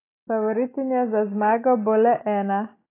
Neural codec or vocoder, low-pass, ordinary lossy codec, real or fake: none; 3.6 kHz; AAC, 24 kbps; real